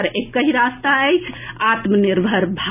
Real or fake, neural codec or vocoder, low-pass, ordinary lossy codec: real; none; 3.6 kHz; none